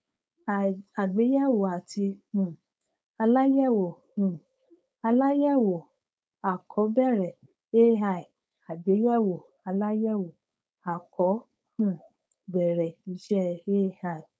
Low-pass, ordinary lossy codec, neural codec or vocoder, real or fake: none; none; codec, 16 kHz, 4.8 kbps, FACodec; fake